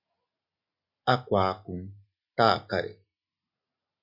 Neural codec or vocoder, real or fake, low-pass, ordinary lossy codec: none; real; 5.4 kHz; MP3, 32 kbps